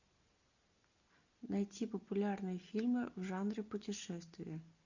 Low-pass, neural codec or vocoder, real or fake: 7.2 kHz; none; real